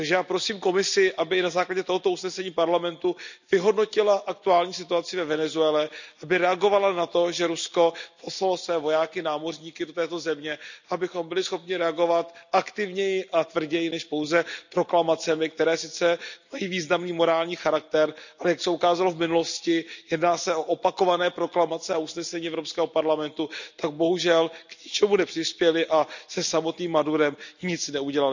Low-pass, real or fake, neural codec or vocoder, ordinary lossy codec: 7.2 kHz; real; none; none